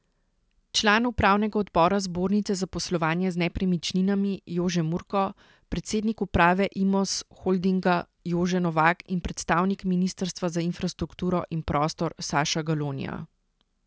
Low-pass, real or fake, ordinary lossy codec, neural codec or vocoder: none; real; none; none